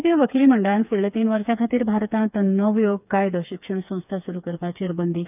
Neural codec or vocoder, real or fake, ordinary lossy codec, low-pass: codec, 16 kHz, 4 kbps, FreqCodec, smaller model; fake; none; 3.6 kHz